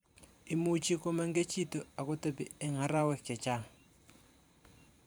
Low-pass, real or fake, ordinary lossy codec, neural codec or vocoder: none; fake; none; vocoder, 44.1 kHz, 128 mel bands every 512 samples, BigVGAN v2